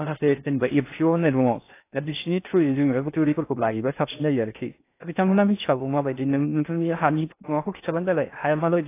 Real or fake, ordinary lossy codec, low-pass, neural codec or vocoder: fake; AAC, 24 kbps; 3.6 kHz; codec, 16 kHz in and 24 kHz out, 0.6 kbps, FocalCodec, streaming, 4096 codes